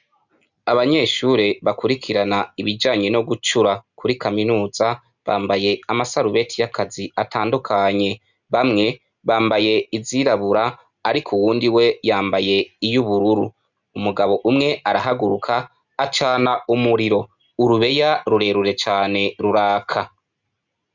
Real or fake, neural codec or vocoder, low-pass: real; none; 7.2 kHz